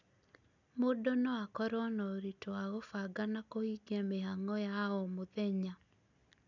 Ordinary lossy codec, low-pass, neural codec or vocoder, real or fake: none; 7.2 kHz; none; real